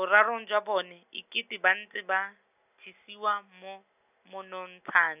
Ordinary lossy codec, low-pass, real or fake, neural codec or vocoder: none; 3.6 kHz; real; none